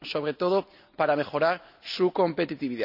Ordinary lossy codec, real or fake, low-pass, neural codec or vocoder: none; real; 5.4 kHz; none